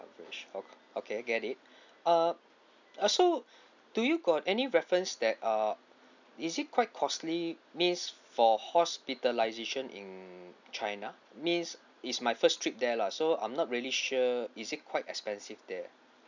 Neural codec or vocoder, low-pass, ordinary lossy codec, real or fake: none; 7.2 kHz; none; real